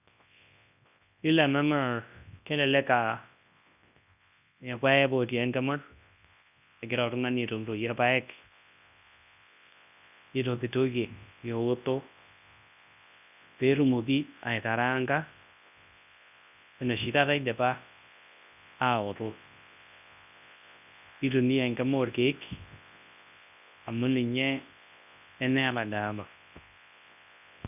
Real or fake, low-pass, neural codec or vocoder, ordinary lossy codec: fake; 3.6 kHz; codec, 24 kHz, 0.9 kbps, WavTokenizer, large speech release; none